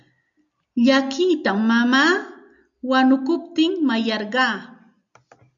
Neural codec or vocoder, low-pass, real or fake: none; 7.2 kHz; real